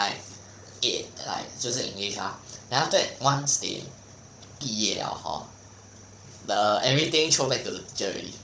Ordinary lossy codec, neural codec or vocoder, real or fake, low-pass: none; codec, 16 kHz, 16 kbps, FunCodec, trained on Chinese and English, 50 frames a second; fake; none